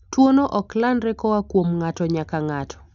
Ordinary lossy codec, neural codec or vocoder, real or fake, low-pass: none; none; real; 7.2 kHz